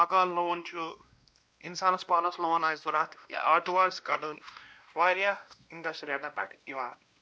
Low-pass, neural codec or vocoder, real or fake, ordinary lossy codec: none; codec, 16 kHz, 1 kbps, X-Codec, WavLM features, trained on Multilingual LibriSpeech; fake; none